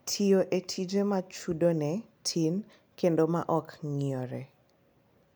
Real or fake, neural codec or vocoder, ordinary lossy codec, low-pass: real; none; none; none